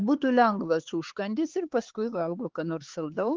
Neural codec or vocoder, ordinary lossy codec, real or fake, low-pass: codec, 16 kHz, 4 kbps, X-Codec, HuBERT features, trained on LibriSpeech; Opus, 16 kbps; fake; 7.2 kHz